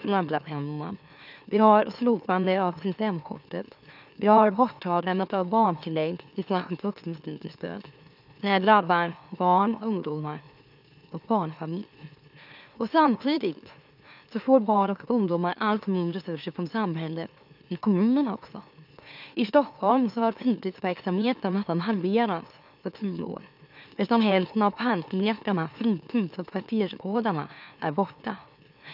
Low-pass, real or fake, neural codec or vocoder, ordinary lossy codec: 5.4 kHz; fake; autoencoder, 44.1 kHz, a latent of 192 numbers a frame, MeloTTS; none